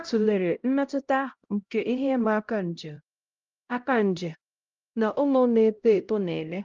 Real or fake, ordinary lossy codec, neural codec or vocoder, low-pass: fake; Opus, 32 kbps; codec, 16 kHz, 0.5 kbps, X-Codec, HuBERT features, trained on LibriSpeech; 7.2 kHz